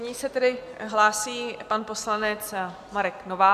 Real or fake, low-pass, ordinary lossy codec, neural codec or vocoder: real; 14.4 kHz; AAC, 96 kbps; none